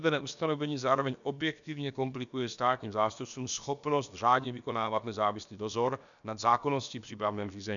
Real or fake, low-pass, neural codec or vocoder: fake; 7.2 kHz; codec, 16 kHz, about 1 kbps, DyCAST, with the encoder's durations